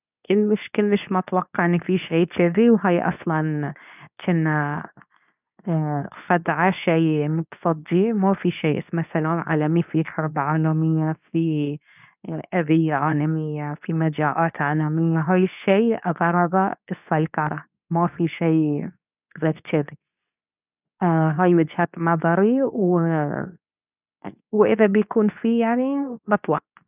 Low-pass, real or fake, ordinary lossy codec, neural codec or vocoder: 3.6 kHz; fake; none; codec, 24 kHz, 0.9 kbps, WavTokenizer, medium speech release version 2